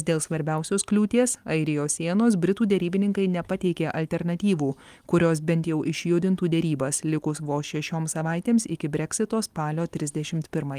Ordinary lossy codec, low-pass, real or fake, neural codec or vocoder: Opus, 32 kbps; 14.4 kHz; real; none